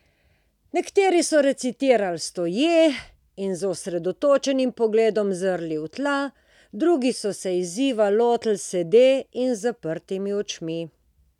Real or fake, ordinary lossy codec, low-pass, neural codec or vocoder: real; none; 19.8 kHz; none